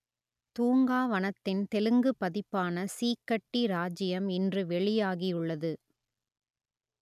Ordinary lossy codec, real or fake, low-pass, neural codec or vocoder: none; real; 14.4 kHz; none